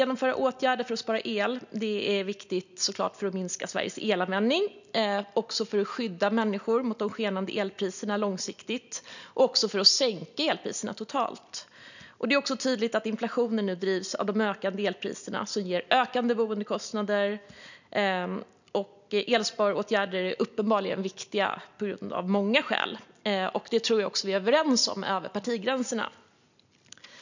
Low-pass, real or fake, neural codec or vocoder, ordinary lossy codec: 7.2 kHz; real; none; none